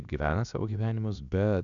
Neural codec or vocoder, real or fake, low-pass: codec, 16 kHz, about 1 kbps, DyCAST, with the encoder's durations; fake; 7.2 kHz